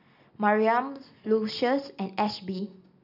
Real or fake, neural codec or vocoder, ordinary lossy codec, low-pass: real; none; none; 5.4 kHz